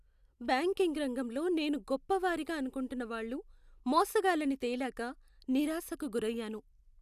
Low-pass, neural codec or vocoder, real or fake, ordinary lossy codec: 14.4 kHz; none; real; none